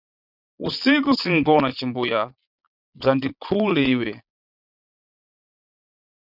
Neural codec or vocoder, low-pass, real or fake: vocoder, 22.05 kHz, 80 mel bands, Vocos; 5.4 kHz; fake